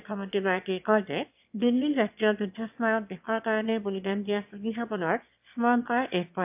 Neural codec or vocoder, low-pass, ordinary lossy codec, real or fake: autoencoder, 22.05 kHz, a latent of 192 numbers a frame, VITS, trained on one speaker; 3.6 kHz; AAC, 32 kbps; fake